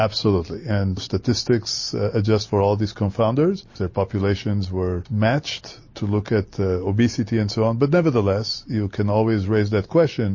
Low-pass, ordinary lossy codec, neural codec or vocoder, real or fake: 7.2 kHz; MP3, 32 kbps; none; real